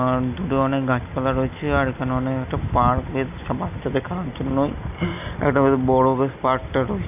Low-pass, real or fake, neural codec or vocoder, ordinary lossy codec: 3.6 kHz; real; none; none